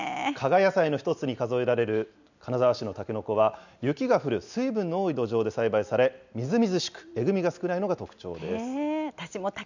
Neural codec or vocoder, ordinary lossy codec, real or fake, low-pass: none; none; real; 7.2 kHz